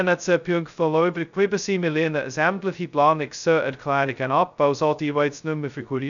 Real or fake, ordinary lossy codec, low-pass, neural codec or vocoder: fake; none; 7.2 kHz; codec, 16 kHz, 0.2 kbps, FocalCodec